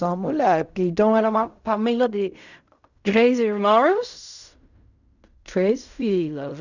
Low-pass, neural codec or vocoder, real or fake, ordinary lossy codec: 7.2 kHz; codec, 16 kHz in and 24 kHz out, 0.4 kbps, LongCat-Audio-Codec, fine tuned four codebook decoder; fake; none